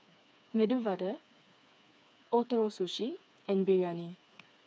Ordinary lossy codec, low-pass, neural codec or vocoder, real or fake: none; none; codec, 16 kHz, 4 kbps, FreqCodec, smaller model; fake